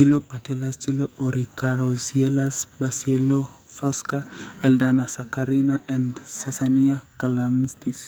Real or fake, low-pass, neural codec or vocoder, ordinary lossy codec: fake; none; codec, 44.1 kHz, 2.6 kbps, SNAC; none